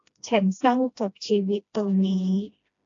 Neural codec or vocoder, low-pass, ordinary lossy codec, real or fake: codec, 16 kHz, 1 kbps, FreqCodec, smaller model; 7.2 kHz; AAC, 48 kbps; fake